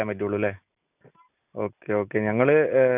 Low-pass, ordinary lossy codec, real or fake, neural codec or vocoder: 3.6 kHz; none; real; none